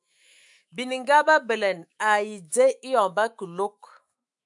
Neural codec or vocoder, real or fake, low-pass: autoencoder, 48 kHz, 128 numbers a frame, DAC-VAE, trained on Japanese speech; fake; 10.8 kHz